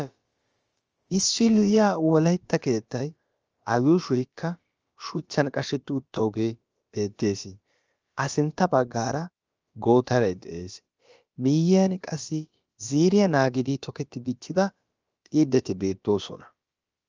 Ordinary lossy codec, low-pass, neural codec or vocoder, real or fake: Opus, 24 kbps; 7.2 kHz; codec, 16 kHz, about 1 kbps, DyCAST, with the encoder's durations; fake